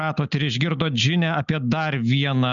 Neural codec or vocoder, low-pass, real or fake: none; 7.2 kHz; real